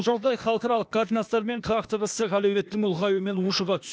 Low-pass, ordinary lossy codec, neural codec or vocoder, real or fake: none; none; codec, 16 kHz, 0.8 kbps, ZipCodec; fake